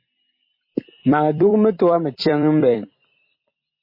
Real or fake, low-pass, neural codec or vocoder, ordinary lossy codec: fake; 5.4 kHz; vocoder, 44.1 kHz, 128 mel bands every 256 samples, BigVGAN v2; MP3, 24 kbps